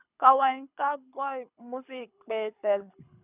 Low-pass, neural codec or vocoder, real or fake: 3.6 kHz; codec, 24 kHz, 6 kbps, HILCodec; fake